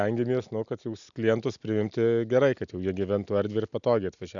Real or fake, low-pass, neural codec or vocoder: real; 7.2 kHz; none